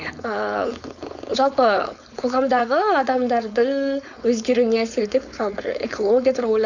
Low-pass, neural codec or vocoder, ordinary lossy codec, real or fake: 7.2 kHz; codec, 16 kHz, 4.8 kbps, FACodec; none; fake